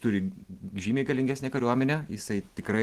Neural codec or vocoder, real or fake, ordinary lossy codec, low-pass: none; real; Opus, 16 kbps; 14.4 kHz